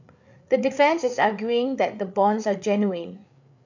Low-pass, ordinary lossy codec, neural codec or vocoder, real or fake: 7.2 kHz; none; codec, 16 kHz, 8 kbps, FreqCodec, larger model; fake